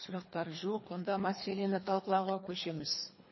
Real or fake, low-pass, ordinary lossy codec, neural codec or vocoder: fake; 7.2 kHz; MP3, 24 kbps; codec, 24 kHz, 3 kbps, HILCodec